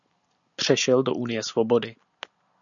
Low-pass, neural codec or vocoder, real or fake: 7.2 kHz; none; real